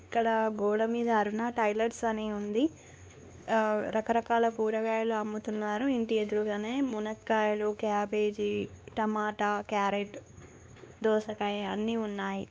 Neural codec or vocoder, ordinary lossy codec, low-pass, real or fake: codec, 16 kHz, 2 kbps, X-Codec, WavLM features, trained on Multilingual LibriSpeech; none; none; fake